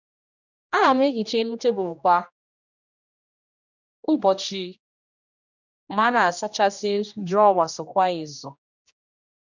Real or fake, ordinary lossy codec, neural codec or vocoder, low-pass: fake; none; codec, 16 kHz, 1 kbps, X-Codec, HuBERT features, trained on general audio; 7.2 kHz